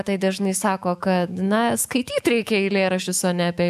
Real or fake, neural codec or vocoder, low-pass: fake; autoencoder, 48 kHz, 128 numbers a frame, DAC-VAE, trained on Japanese speech; 14.4 kHz